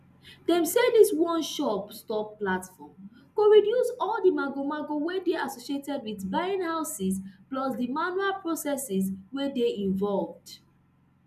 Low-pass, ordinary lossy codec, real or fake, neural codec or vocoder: 14.4 kHz; none; real; none